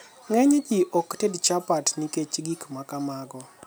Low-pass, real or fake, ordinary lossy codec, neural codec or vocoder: none; real; none; none